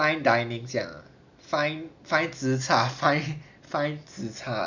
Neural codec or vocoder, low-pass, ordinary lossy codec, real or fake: none; 7.2 kHz; none; real